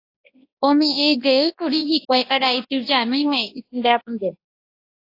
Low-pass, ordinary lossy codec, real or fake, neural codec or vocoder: 5.4 kHz; AAC, 32 kbps; fake; codec, 24 kHz, 0.9 kbps, WavTokenizer, large speech release